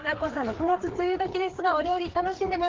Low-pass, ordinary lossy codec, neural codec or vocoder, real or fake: 7.2 kHz; Opus, 16 kbps; codec, 16 kHz, 4 kbps, FreqCodec, larger model; fake